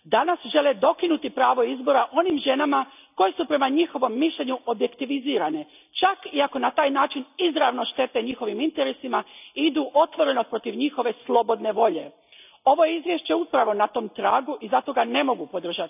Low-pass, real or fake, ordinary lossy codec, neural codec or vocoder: 3.6 kHz; real; none; none